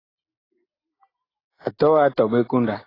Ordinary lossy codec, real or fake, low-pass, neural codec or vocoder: AAC, 24 kbps; real; 5.4 kHz; none